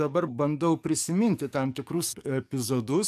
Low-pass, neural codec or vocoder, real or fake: 14.4 kHz; codec, 44.1 kHz, 7.8 kbps, DAC; fake